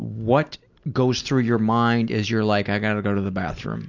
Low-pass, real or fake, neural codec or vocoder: 7.2 kHz; real; none